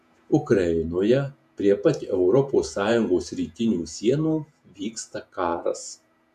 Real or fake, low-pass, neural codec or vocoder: fake; 14.4 kHz; vocoder, 48 kHz, 128 mel bands, Vocos